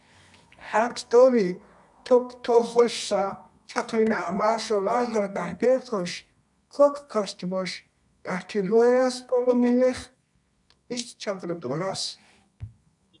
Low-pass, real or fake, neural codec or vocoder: 10.8 kHz; fake; codec, 24 kHz, 0.9 kbps, WavTokenizer, medium music audio release